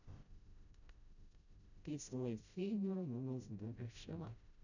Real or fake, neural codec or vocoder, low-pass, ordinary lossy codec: fake; codec, 16 kHz, 0.5 kbps, FreqCodec, smaller model; 7.2 kHz; none